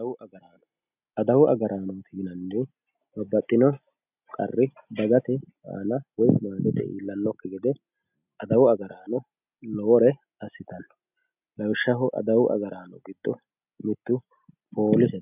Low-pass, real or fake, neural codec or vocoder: 3.6 kHz; real; none